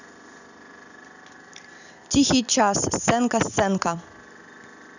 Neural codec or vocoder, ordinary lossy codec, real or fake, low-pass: none; none; real; 7.2 kHz